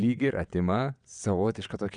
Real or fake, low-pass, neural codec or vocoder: fake; 9.9 kHz; vocoder, 22.05 kHz, 80 mel bands, WaveNeXt